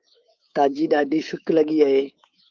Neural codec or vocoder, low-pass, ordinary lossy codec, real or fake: codec, 24 kHz, 3.1 kbps, DualCodec; 7.2 kHz; Opus, 32 kbps; fake